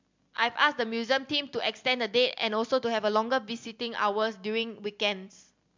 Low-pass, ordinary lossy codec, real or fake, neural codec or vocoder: 7.2 kHz; MP3, 64 kbps; real; none